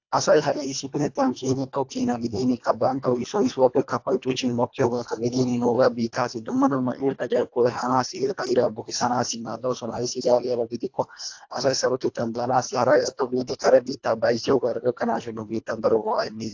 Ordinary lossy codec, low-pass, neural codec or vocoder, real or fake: AAC, 48 kbps; 7.2 kHz; codec, 24 kHz, 1.5 kbps, HILCodec; fake